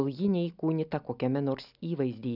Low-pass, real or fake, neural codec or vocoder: 5.4 kHz; real; none